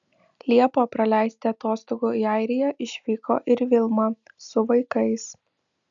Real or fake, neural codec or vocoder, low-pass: real; none; 7.2 kHz